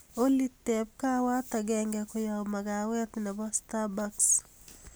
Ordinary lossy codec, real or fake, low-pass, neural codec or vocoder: none; real; none; none